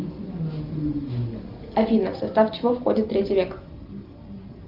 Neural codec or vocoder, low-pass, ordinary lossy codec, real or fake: none; 5.4 kHz; Opus, 32 kbps; real